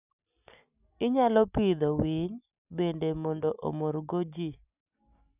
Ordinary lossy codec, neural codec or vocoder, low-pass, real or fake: none; none; 3.6 kHz; real